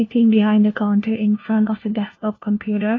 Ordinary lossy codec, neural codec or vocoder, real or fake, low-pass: AAC, 32 kbps; codec, 16 kHz, 1 kbps, FunCodec, trained on LibriTTS, 50 frames a second; fake; 7.2 kHz